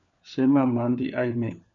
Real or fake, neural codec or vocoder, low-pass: fake; codec, 16 kHz, 4 kbps, FunCodec, trained on LibriTTS, 50 frames a second; 7.2 kHz